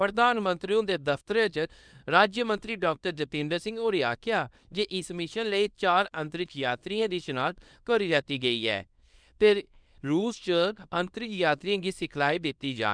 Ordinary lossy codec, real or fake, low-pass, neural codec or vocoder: none; fake; 9.9 kHz; codec, 24 kHz, 0.9 kbps, WavTokenizer, small release